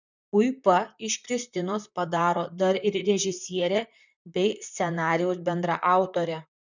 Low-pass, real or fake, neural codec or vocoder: 7.2 kHz; fake; vocoder, 44.1 kHz, 128 mel bands, Pupu-Vocoder